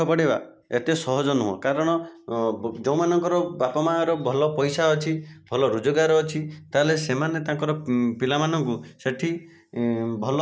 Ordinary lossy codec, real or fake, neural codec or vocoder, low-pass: none; real; none; none